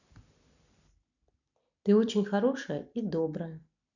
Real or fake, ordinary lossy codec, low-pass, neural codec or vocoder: fake; none; 7.2 kHz; vocoder, 44.1 kHz, 128 mel bands every 256 samples, BigVGAN v2